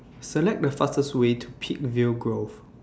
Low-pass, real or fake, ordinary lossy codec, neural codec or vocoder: none; real; none; none